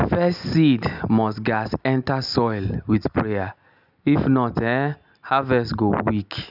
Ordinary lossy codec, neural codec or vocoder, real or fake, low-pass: none; none; real; 5.4 kHz